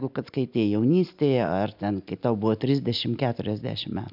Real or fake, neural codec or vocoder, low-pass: real; none; 5.4 kHz